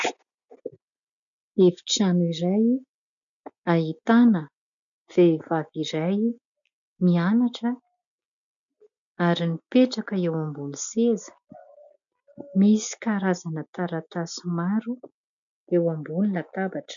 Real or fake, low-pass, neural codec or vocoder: real; 7.2 kHz; none